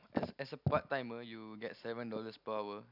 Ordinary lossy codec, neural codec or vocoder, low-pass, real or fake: none; none; 5.4 kHz; real